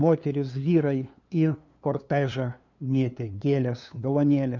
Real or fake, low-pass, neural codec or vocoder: fake; 7.2 kHz; codec, 16 kHz, 2 kbps, FunCodec, trained on LibriTTS, 25 frames a second